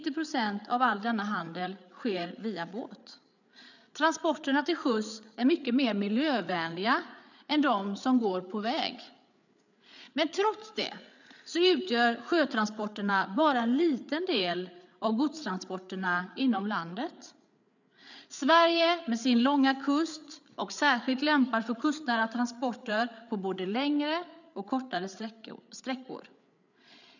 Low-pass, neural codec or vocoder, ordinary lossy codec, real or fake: 7.2 kHz; codec, 16 kHz, 8 kbps, FreqCodec, larger model; none; fake